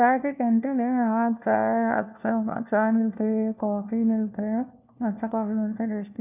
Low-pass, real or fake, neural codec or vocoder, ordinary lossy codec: 3.6 kHz; fake; codec, 16 kHz, 1 kbps, FunCodec, trained on LibriTTS, 50 frames a second; none